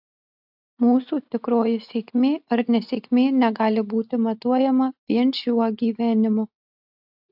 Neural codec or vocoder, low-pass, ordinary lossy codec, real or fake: none; 5.4 kHz; AAC, 48 kbps; real